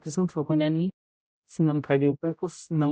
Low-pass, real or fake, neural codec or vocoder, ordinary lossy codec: none; fake; codec, 16 kHz, 0.5 kbps, X-Codec, HuBERT features, trained on general audio; none